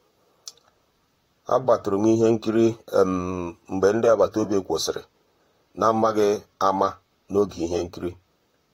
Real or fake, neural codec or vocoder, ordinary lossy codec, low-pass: fake; vocoder, 44.1 kHz, 128 mel bands, Pupu-Vocoder; AAC, 48 kbps; 19.8 kHz